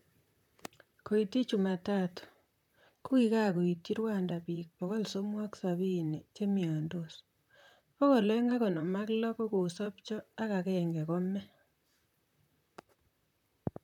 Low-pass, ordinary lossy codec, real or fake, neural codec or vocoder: 19.8 kHz; none; fake; vocoder, 44.1 kHz, 128 mel bands, Pupu-Vocoder